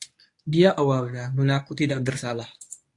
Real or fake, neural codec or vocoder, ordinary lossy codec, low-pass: fake; codec, 24 kHz, 0.9 kbps, WavTokenizer, medium speech release version 1; AAC, 48 kbps; 10.8 kHz